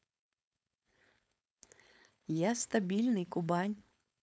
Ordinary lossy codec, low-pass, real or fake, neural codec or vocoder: none; none; fake; codec, 16 kHz, 4.8 kbps, FACodec